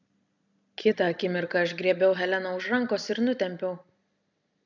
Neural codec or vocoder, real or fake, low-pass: none; real; 7.2 kHz